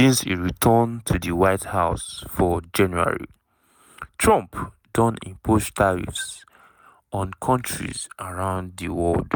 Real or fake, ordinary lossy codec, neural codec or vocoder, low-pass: real; none; none; none